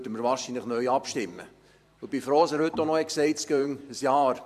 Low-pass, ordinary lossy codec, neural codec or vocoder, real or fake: 14.4 kHz; MP3, 96 kbps; vocoder, 44.1 kHz, 128 mel bands every 512 samples, BigVGAN v2; fake